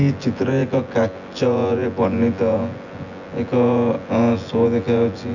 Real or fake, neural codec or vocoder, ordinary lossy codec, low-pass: fake; vocoder, 24 kHz, 100 mel bands, Vocos; none; 7.2 kHz